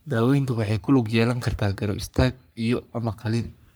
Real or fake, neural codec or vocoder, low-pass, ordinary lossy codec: fake; codec, 44.1 kHz, 3.4 kbps, Pupu-Codec; none; none